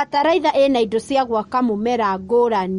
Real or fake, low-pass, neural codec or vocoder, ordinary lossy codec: real; 10.8 kHz; none; MP3, 48 kbps